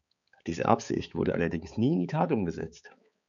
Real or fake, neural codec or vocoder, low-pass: fake; codec, 16 kHz, 4 kbps, X-Codec, HuBERT features, trained on balanced general audio; 7.2 kHz